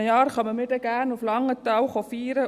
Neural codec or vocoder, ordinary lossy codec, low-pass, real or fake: none; Opus, 64 kbps; 14.4 kHz; real